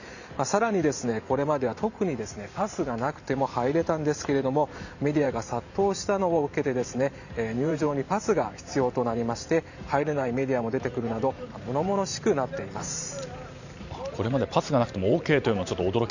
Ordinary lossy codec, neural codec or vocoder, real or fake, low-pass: none; vocoder, 44.1 kHz, 128 mel bands every 512 samples, BigVGAN v2; fake; 7.2 kHz